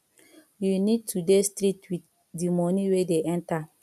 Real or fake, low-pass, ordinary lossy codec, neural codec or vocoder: real; 14.4 kHz; none; none